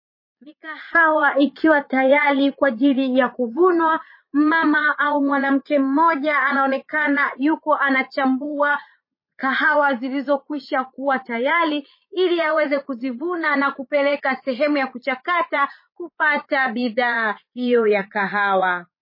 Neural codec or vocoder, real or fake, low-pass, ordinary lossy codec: vocoder, 22.05 kHz, 80 mel bands, Vocos; fake; 5.4 kHz; MP3, 24 kbps